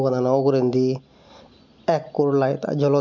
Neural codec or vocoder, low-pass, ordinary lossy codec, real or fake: none; 7.2 kHz; none; real